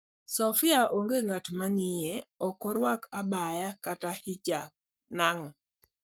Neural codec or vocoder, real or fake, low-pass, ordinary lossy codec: codec, 44.1 kHz, 7.8 kbps, Pupu-Codec; fake; none; none